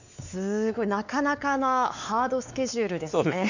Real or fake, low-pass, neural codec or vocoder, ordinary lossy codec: fake; 7.2 kHz; codec, 16 kHz, 4 kbps, X-Codec, WavLM features, trained on Multilingual LibriSpeech; none